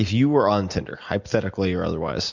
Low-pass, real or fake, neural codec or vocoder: 7.2 kHz; real; none